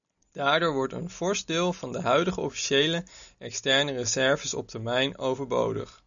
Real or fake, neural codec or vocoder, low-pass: real; none; 7.2 kHz